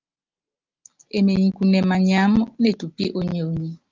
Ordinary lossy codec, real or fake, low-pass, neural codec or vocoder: Opus, 32 kbps; real; 7.2 kHz; none